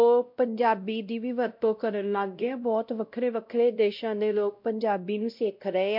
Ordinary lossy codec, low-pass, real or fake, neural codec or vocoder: MP3, 48 kbps; 5.4 kHz; fake; codec, 16 kHz, 0.5 kbps, X-Codec, WavLM features, trained on Multilingual LibriSpeech